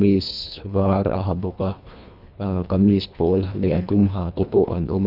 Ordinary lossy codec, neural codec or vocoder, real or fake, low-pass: none; codec, 24 kHz, 1.5 kbps, HILCodec; fake; 5.4 kHz